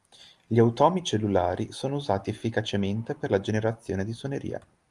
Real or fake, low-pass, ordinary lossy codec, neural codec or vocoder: real; 10.8 kHz; Opus, 32 kbps; none